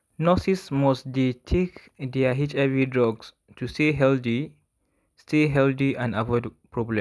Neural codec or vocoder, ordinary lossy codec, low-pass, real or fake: none; none; none; real